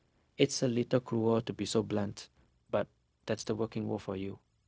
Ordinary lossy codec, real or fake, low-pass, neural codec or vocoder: none; fake; none; codec, 16 kHz, 0.4 kbps, LongCat-Audio-Codec